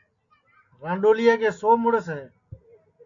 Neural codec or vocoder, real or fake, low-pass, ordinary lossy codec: none; real; 7.2 kHz; AAC, 48 kbps